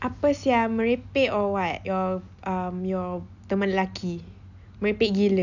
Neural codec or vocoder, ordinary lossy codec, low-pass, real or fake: none; none; 7.2 kHz; real